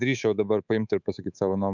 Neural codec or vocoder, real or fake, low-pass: codec, 24 kHz, 3.1 kbps, DualCodec; fake; 7.2 kHz